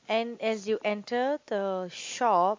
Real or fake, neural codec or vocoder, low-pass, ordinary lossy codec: real; none; 7.2 kHz; MP3, 48 kbps